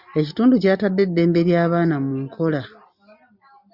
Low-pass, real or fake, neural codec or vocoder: 5.4 kHz; real; none